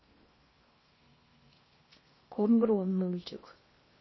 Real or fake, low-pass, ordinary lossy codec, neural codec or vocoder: fake; 7.2 kHz; MP3, 24 kbps; codec, 16 kHz in and 24 kHz out, 0.6 kbps, FocalCodec, streaming, 4096 codes